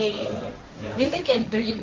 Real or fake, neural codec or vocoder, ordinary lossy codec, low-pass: fake; codec, 16 kHz, 1.1 kbps, Voila-Tokenizer; Opus, 24 kbps; 7.2 kHz